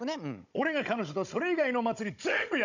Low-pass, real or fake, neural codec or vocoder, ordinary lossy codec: 7.2 kHz; fake; codec, 16 kHz, 16 kbps, FunCodec, trained on Chinese and English, 50 frames a second; none